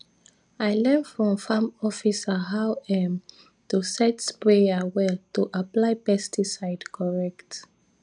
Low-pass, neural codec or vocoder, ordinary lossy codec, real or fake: 9.9 kHz; none; none; real